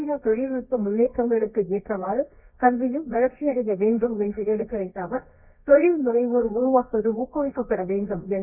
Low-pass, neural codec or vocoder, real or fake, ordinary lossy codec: 3.6 kHz; codec, 24 kHz, 0.9 kbps, WavTokenizer, medium music audio release; fake; none